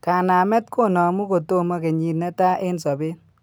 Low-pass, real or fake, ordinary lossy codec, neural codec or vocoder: none; real; none; none